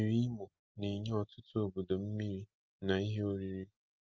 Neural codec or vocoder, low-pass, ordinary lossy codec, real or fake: none; 7.2 kHz; Opus, 24 kbps; real